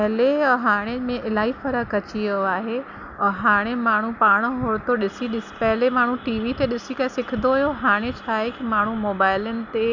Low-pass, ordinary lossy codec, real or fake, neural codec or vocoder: 7.2 kHz; none; real; none